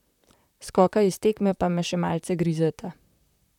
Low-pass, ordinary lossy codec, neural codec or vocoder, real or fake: 19.8 kHz; none; vocoder, 44.1 kHz, 128 mel bands, Pupu-Vocoder; fake